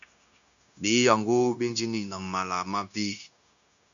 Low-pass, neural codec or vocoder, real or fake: 7.2 kHz; codec, 16 kHz, 0.9 kbps, LongCat-Audio-Codec; fake